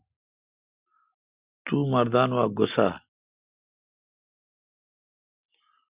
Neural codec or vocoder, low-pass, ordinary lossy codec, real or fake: none; 3.6 kHz; Opus, 64 kbps; real